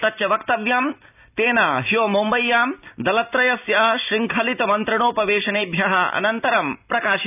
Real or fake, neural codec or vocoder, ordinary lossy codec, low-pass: real; none; none; 3.6 kHz